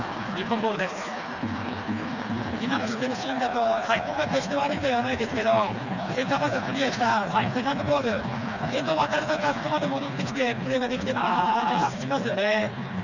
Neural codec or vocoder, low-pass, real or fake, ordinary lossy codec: codec, 16 kHz, 2 kbps, FreqCodec, smaller model; 7.2 kHz; fake; none